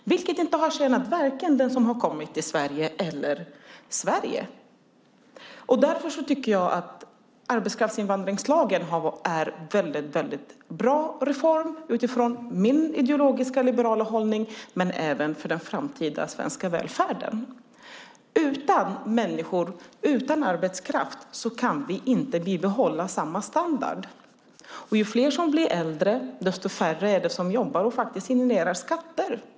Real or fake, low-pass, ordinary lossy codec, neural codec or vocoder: real; none; none; none